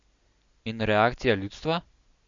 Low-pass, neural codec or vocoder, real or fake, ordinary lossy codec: 7.2 kHz; none; real; MP3, 64 kbps